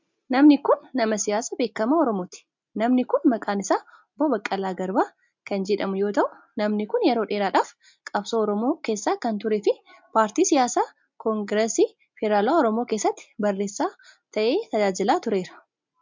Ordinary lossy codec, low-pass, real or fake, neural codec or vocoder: MP3, 64 kbps; 7.2 kHz; real; none